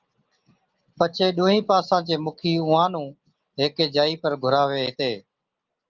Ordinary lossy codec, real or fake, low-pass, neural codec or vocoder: Opus, 32 kbps; real; 7.2 kHz; none